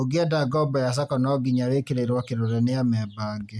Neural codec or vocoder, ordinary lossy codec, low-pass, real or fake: none; none; none; real